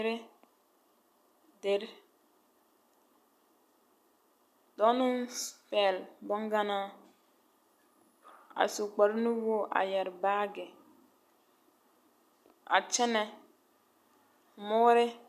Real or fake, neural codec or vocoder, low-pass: real; none; 14.4 kHz